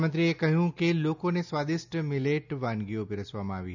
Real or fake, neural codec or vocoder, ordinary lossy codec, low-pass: real; none; none; 7.2 kHz